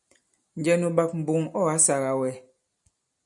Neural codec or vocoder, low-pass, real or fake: none; 10.8 kHz; real